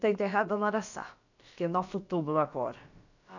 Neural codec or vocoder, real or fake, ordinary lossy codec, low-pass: codec, 16 kHz, about 1 kbps, DyCAST, with the encoder's durations; fake; none; 7.2 kHz